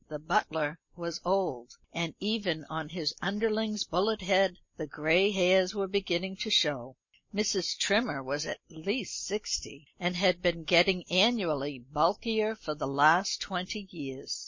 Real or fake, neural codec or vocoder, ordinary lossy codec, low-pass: real; none; MP3, 32 kbps; 7.2 kHz